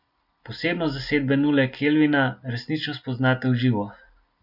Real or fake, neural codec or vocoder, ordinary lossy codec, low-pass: real; none; none; 5.4 kHz